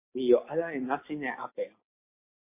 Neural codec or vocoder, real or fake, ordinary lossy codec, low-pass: none; real; MP3, 24 kbps; 3.6 kHz